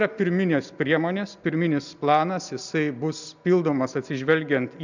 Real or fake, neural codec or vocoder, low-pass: real; none; 7.2 kHz